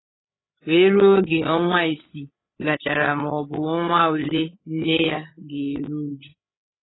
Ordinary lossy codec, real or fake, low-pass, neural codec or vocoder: AAC, 16 kbps; fake; 7.2 kHz; codec, 16 kHz, 8 kbps, FreqCodec, larger model